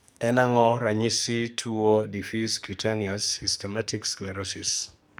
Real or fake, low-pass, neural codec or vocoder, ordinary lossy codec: fake; none; codec, 44.1 kHz, 2.6 kbps, SNAC; none